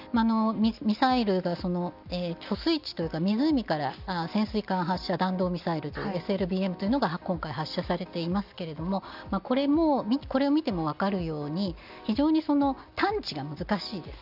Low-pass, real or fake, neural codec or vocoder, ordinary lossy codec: 5.4 kHz; real; none; none